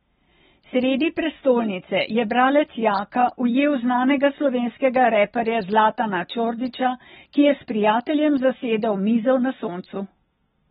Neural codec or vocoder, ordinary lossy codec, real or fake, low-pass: none; AAC, 16 kbps; real; 19.8 kHz